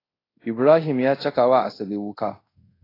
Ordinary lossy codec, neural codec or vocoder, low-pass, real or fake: AAC, 24 kbps; codec, 24 kHz, 0.5 kbps, DualCodec; 5.4 kHz; fake